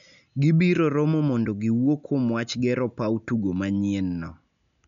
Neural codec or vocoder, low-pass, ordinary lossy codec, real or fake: none; 7.2 kHz; none; real